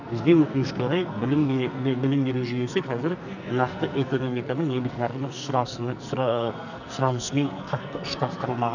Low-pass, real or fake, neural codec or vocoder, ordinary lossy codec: 7.2 kHz; fake; codec, 44.1 kHz, 2.6 kbps, SNAC; none